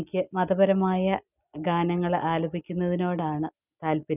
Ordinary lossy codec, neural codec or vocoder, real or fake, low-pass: none; none; real; 3.6 kHz